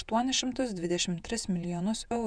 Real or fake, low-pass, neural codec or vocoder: fake; 9.9 kHz; vocoder, 48 kHz, 128 mel bands, Vocos